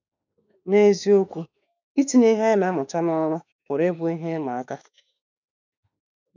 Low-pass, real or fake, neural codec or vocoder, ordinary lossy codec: 7.2 kHz; fake; autoencoder, 48 kHz, 32 numbers a frame, DAC-VAE, trained on Japanese speech; none